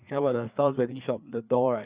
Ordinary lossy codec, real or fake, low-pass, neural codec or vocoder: Opus, 64 kbps; fake; 3.6 kHz; codec, 16 kHz, 4 kbps, FreqCodec, larger model